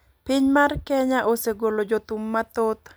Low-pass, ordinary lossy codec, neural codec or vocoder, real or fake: none; none; none; real